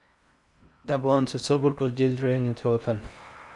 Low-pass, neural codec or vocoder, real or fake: 10.8 kHz; codec, 16 kHz in and 24 kHz out, 0.6 kbps, FocalCodec, streaming, 4096 codes; fake